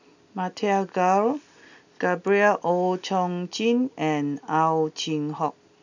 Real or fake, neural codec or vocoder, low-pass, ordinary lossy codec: real; none; 7.2 kHz; none